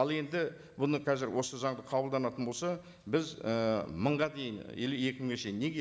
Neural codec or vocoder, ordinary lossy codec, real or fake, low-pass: none; none; real; none